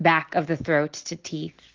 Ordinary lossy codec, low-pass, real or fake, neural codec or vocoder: Opus, 16 kbps; 7.2 kHz; real; none